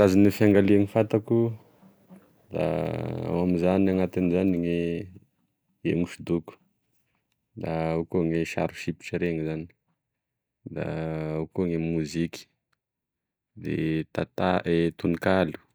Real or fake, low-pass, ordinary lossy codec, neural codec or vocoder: real; none; none; none